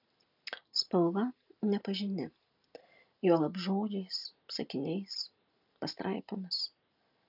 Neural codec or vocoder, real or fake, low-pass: none; real; 5.4 kHz